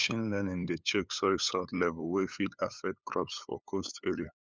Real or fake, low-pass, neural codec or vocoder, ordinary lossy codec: fake; none; codec, 16 kHz, 8 kbps, FunCodec, trained on LibriTTS, 25 frames a second; none